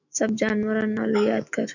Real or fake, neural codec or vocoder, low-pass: fake; autoencoder, 48 kHz, 128 numbers a frame, DAC-VAE, trained on Japanese speech; 7.2 kHz